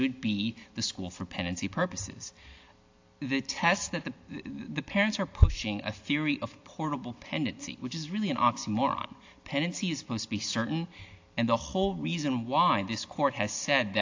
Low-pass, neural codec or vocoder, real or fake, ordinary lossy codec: 7.2 kHz; none; real; AAC, 48 kbps